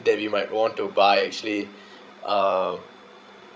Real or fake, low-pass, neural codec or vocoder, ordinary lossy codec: fake; none; codec, 16 kHz, 16 kbps, FreqCodec, larger model; none